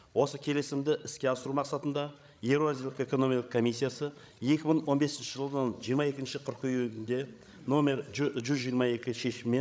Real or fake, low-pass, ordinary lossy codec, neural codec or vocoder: fake; none; none; codec, 16 kHz, 16 kbps, FreqCodec, larger model